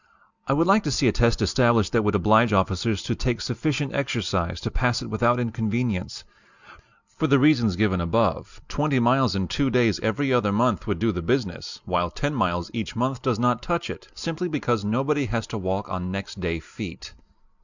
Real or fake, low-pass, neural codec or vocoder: real; 7.2 kHz; none